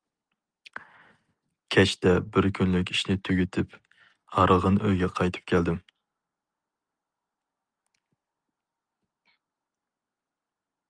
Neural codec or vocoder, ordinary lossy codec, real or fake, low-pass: none; Opus, 32 kbps; real; 9.9 kHz